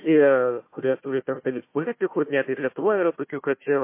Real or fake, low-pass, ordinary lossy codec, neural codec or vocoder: fake; 3.6 kHz; MP3, 24 kbps; codec, 16 kHz, 1 kbps, FunCodec, trained on Chinese and English, 50 frames a second